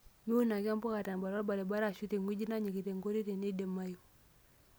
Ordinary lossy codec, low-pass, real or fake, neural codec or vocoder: none; none; real; none